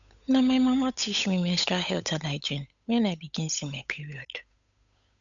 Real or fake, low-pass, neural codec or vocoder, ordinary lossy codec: fake; 7.2 kHz; codec, 16 kHz, 8 kbps, FunCodec, trained on Chinese and English, 25 frames a second; none